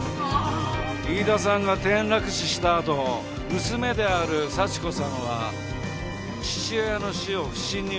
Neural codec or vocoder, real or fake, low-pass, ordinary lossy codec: none; real; none; none